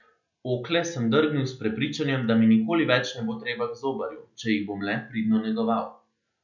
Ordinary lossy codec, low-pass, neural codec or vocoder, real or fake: none; 7.2 kHz; none; real